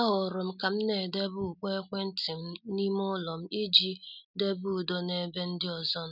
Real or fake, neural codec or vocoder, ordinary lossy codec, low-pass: real; none; none; 5.4 kHz